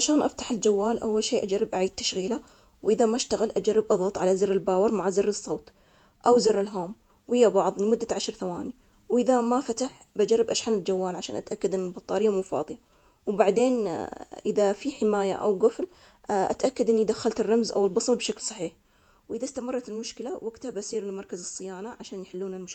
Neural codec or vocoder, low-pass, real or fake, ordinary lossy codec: vocoder, 48 kHz, 128 mel bands, Vocos; 19.8 kHz; fake; none